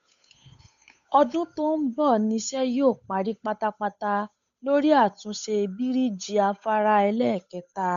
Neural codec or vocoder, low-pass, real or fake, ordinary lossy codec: codec, 16 kHz, 8 kbps, FunCodec, trained on Chinese and English, 25 frames a second; 7.2 kHz; fake; none